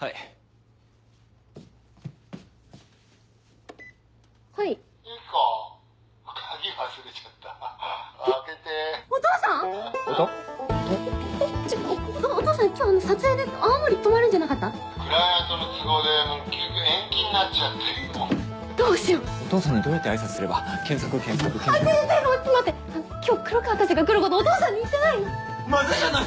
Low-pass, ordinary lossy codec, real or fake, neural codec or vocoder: none; none; real; none